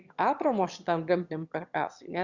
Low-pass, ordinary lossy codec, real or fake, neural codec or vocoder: 7.2 kHz; Opus, 64 kbps; fake; autoencoder, 22.05 kHz, a latent of 192 numbers a frame, VITS, trained on one speaker